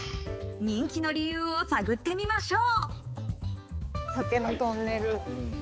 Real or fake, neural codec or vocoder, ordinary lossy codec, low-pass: fake; codec, 16 kHz, 4 kbps, X-Codec, HuBERT features, trained on balanced general audio; none; none